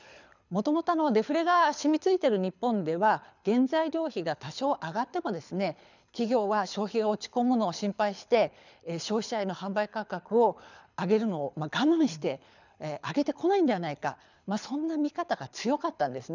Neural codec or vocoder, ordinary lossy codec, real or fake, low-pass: codec, 24 kHz, 6 kbps, HILCodec; none; fake; 7.2 kHz